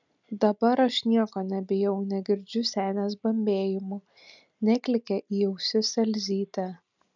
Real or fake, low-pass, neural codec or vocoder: real; 7.2 kHz; none